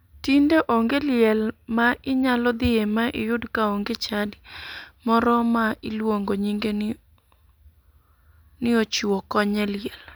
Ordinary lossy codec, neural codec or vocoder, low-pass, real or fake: none; none; none; real